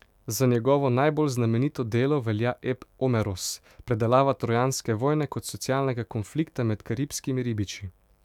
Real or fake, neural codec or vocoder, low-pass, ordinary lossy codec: fake; autoencoder, 48 kHz, 128 numbers a frame, DAC-VAE, trained on Japanese speech; 19.8 kHz; none